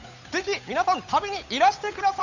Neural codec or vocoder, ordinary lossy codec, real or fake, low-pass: codec, 16 kHz, 8 kbps, FunCodec, trained on Chinese and English, 25 frames a second; none; fake; 7.2 kHz